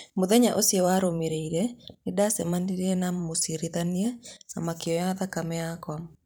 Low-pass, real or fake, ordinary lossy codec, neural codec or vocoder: none; fake; none; vocoder, 44.1 kHz, 128 mel bands every 512 samples, BigVGAN v2